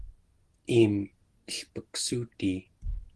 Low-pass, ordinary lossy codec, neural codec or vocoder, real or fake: 10.8 kHz; Opus, 16 kbps; none; real